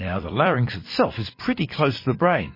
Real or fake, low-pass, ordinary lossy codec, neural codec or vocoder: fake; 5.4 kHz; MP3, 24 kbps; vocoder, 44.1 kHz, 128 mel bands every 256 samples, BigVGAN v2